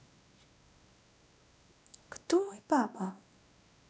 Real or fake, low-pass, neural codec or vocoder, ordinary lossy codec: fake; none; codec, 16 kHz, 1 kbps, X-Codec, WavLM features, trained on Multilingual LibriSpeech; none